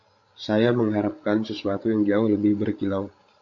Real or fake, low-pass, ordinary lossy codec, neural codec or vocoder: fake; 7.2 kHz; AAC, 48 kbps; codec, 16 kHz, 16 kbps, FreqCodec, larger model